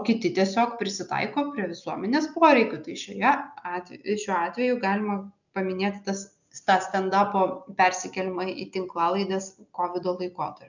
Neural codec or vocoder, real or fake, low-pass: none; real; 7.2 kHz